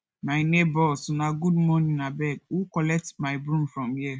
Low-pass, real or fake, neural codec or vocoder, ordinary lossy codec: none; real; none; none